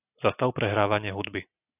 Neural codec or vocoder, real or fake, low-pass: none; real; 3.6 kHz